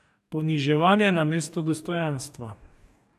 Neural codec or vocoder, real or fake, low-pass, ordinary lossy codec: codec, 44.1 kHz, 2.6 kbps, DAC; fake; 14.4 kHz; none